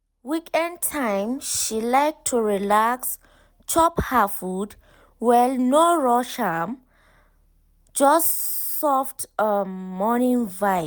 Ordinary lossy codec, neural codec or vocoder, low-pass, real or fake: none; none; none; real